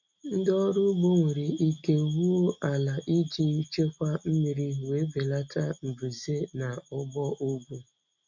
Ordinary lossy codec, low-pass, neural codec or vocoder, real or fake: none; 7.2 kHz; none; real